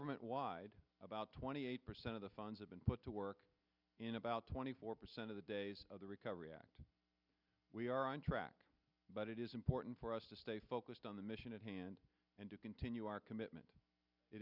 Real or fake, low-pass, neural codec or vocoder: real; 5.4 kHz; none